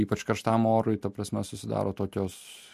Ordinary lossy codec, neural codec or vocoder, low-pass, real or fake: MP3, 64 kbps; none; 14.4 kHz; real